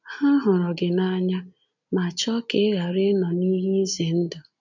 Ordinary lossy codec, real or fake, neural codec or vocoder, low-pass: none; real; none; 7.2 kHz